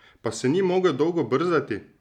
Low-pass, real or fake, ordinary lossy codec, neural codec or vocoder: 19.8 kHz; real; none; none